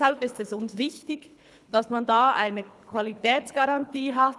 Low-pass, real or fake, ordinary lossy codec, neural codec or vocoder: none; fake; none; codec, 24 kHz, 3 kbps, HILCodec